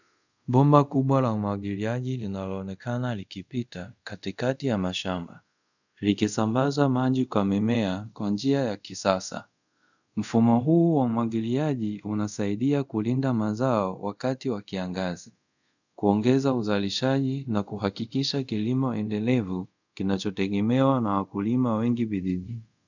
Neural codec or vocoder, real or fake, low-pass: codec, 24 kHz, 0.5 kbps, DualCodec; fake; 7.2 kHz